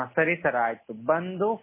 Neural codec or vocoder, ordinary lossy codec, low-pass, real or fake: none; MP3, 16 kbps; 3.6 kHz; real